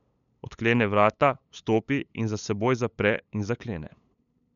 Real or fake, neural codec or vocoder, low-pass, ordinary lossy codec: fake; codec, 16 kHz, 8 kbps, FunCodec, trained on LibriTTS, 25 frames a second; 7.2 kHz; none